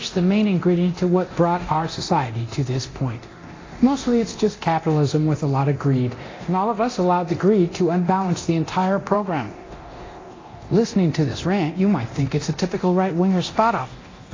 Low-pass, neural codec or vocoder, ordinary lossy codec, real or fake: 7.2 kHz; codec, 24 kHz, 0.9 kbps, DualCodec; AAC, 32 kbps; fake